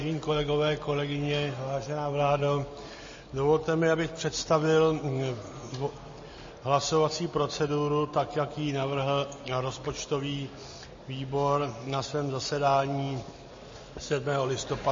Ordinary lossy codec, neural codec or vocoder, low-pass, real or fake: MP3, 32 kbps; none; 7.2 kHz; real